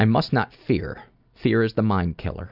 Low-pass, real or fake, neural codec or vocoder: 5.4 kHz; real; none